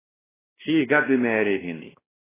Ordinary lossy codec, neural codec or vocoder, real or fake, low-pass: AAC, 16 kbps; codec, 16 kHz, 1 kbps, X-Codec, WavLM features, trained on Multilingual LibriSpeech; fake; 3.6 kHz